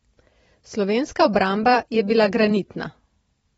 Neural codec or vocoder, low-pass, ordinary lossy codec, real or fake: vocoder, 44.1 kHz, 128 mel bands every 512 samples, BigVGAN v2; 19.8 kHz; AAC, 24 kbps; fake